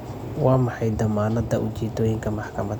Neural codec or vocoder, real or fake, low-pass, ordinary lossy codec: none; real; 19.8 kHz; none